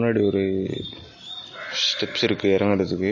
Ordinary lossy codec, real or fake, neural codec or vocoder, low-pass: MP3, 32 kbps; real; none; 7.2 kHz